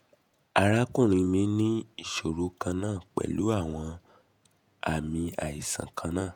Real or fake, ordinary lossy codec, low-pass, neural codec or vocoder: fake; none; none; vocoder, 48 kHz, 128 mel bands, Vocos